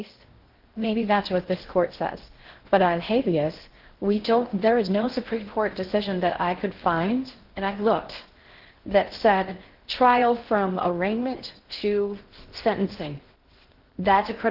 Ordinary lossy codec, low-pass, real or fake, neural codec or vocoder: Opus, 16 kbps; 5.4 kHz; fake; codec, 16 kHz in and 24 kHz out, 0.6 kbps, FocalCodec, streaming, 4096 codes